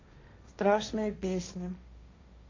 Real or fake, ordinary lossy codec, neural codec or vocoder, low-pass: fake; MP3, 48 kbps; codec, 16 kHz, 1.1 kbps, Voila-Tokenizer; 7.2 kHz